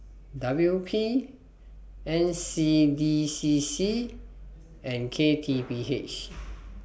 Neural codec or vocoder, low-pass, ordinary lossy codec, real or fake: none; none; none; real